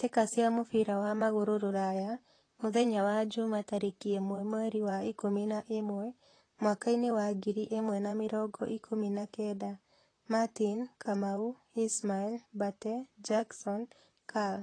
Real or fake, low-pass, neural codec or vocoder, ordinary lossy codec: fake; 9.9 kHz; vocoder, 44.1 kHz, 128 mel bands, Pupu-Vocoder; AAC, 32 kbps